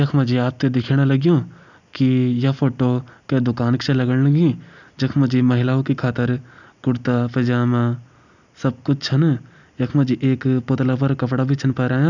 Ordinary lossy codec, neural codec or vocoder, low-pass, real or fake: none; none; 7.2 kHz; real